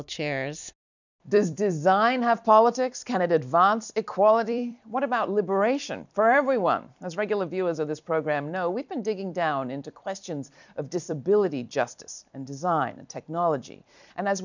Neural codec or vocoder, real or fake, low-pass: vocoder, 44.1 kHz, 128 mel bands every 256 samples, BigVGAN v2; fake; 7.2 kHz